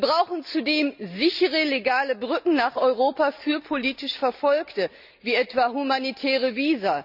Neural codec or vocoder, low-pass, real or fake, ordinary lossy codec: none; 5.4 kHz; real; none